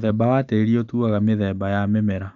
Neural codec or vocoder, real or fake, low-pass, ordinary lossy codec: none; real; 7.2 kHz; none